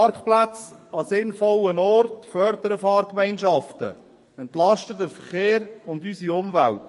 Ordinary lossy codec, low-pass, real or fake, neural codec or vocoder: MP3, 48 kbps; 14.4 kHz; fake; codec, 44.1 kHz, 2.6 kbps, SNAC